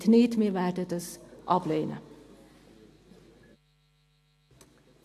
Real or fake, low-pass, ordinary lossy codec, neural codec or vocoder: real; 14.4 kHz; AAC, 64 kbps; none